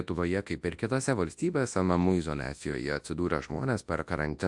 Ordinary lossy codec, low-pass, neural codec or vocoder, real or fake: AAC, 64 kbps; 10.8 kHz; codec, 24 kHz, 0.9 kbps, WavTokenizer, large speech release; fake